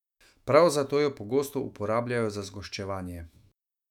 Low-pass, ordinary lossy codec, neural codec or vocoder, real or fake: 19.8 kHz; none; autoencoder, 48 kHz, 128 numbers a frame, DAC-VAE, trained on Japanese speech; fake